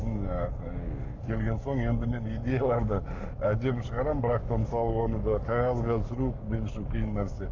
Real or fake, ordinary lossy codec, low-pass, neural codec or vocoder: fake; AAC, 48 kbps; 7.2 kHz; codec, 44.1 kHz, 7.8 kbps, Pupu-Codec